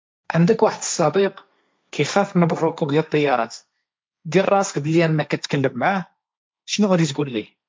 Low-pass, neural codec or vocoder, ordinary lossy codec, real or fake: none; codec, 16 kHz, 1.1 kbps, Voila-Tokenizer; none; fake